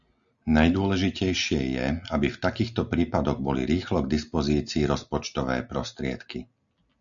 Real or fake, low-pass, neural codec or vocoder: real; 7.2 kHz; none